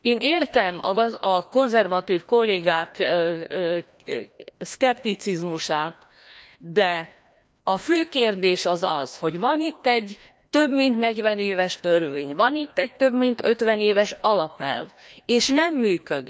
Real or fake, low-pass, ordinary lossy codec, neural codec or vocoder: fake; none; none; codec, 16 kHz, 1 kbps, FreqCodec, larger model